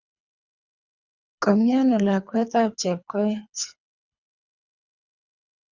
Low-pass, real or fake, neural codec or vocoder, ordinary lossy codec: 7.2 kHz; fake; codec, 24 kHz, 3 kbps, HILCodec; Opus, 64 kbps